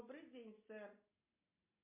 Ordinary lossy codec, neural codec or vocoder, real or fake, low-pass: MP3, 24 kbps; none; real; 3.6 kHz